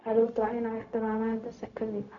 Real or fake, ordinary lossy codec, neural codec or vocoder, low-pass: fake; none; codec, 16 kHz, 0.4 kbps, LongCat-Audio-Codec; 7.2 kHz